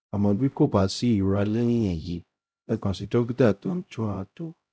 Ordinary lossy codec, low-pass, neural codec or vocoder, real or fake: none; none; codec, 16 kHz, 0.5 kbps, X-Codec, HuBERT features, trained on LibriSpeech; fake